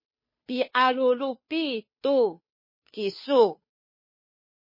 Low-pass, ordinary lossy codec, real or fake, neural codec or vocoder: 5.4 kHz; MP3, 24 kbps; fake; codec, 16 kHz, 2 kbps, FunCodec, trained on Chinese and English, 25 frames a second